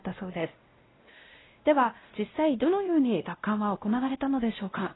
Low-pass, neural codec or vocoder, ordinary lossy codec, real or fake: 7.2 kHz; codec, 16 kHz, 0.5 kbps, X-Codec, WavLM features, trained on Multilingual LibriSpeech; AAC, 16 kbps; fake